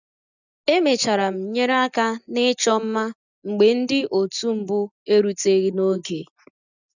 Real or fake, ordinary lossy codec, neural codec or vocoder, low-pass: real; none; none; 7.2 kHz